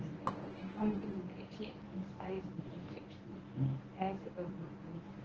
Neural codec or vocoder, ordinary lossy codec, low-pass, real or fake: codec, 24 kHz, 0.9 kbps, WavTokenizer, medium speech release version 1; Opus, 16 kbps; 7.2 kHz; fake